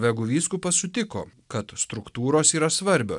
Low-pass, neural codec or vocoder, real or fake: 10.8 kHz; none; real